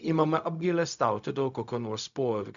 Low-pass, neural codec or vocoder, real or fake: 7.2 kHz; codec, 16 kHz, 0.4 kbps, LongCat-Audio-Codec; fake